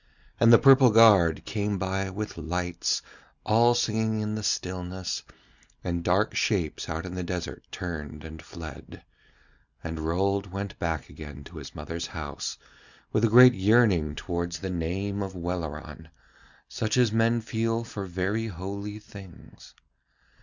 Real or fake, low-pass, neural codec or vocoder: real; 7.2 kHz; none